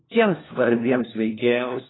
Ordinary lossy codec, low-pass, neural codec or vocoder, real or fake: AAC, 16 kbps; 7.2 kHz; codec, 16 kHz, 1 kbps, FunCodec, trained on LibriTTS, 50 frames a second; fake